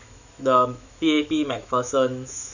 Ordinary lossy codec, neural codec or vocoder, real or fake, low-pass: none; autoencoder, 48 kHz, 128 numbers a frame, DAC-VAE, trained on Japanese speech; fake; 7.2 kHz